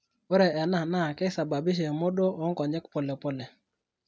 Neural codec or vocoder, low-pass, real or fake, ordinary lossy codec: none; none; real; none